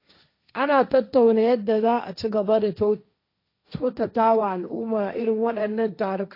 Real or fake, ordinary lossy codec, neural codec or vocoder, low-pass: fake; AAC, 32 kbps; codec, 16 kHz, 1.1 kbps, Voila-Tokenizer; 5.4 kHz